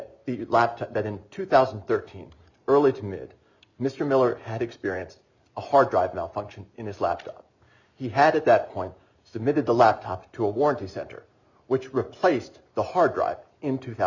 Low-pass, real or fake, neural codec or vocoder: 7.2 kHz; real; none